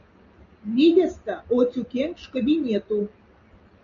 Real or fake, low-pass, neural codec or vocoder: real; 7.2 kHz; none